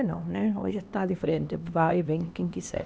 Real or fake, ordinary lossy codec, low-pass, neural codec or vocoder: fake; none; none; codec, 16 kHz, 2 kbps, X-Codec, HuBERT features, trained on LibriSpeech